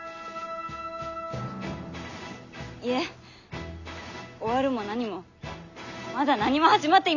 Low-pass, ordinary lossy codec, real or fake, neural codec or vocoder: 7.2 kHz; none; real; none